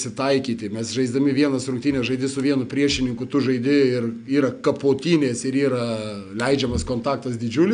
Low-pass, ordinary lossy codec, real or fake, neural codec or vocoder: 9.9 kHz; AAC, 96 kbps; real; none